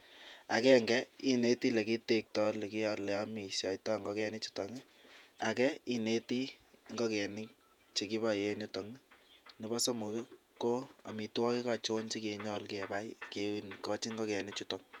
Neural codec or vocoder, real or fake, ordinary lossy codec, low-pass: vocoder, 48 kHz, 128 mel bands, Vocos; fake; none; 19.8 kHz